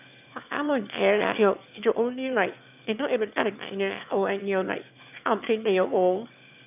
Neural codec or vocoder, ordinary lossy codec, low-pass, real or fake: autoencoder, 22.05 kHz, a latent of 192 numbers a frame, VITS, trained on one speaker; none; 3.6 kHz; fake